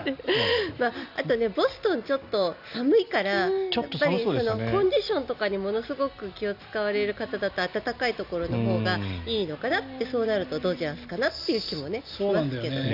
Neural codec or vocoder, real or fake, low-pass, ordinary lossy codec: none; real; 5.4 kHz; none